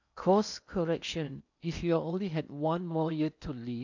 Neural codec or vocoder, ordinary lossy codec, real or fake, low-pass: codec, 16 kHz in and 24 kHz out, 0.8 kbps, FocalCodec, streaming, 65536 codes; none; fake; 7.2 kHz